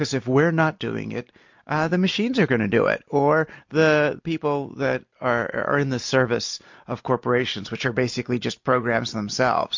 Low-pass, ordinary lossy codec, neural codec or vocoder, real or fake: 7.2 kHz; MP3, 48 kbps; none; real